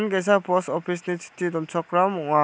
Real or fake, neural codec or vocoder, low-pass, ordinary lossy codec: real; none; none; none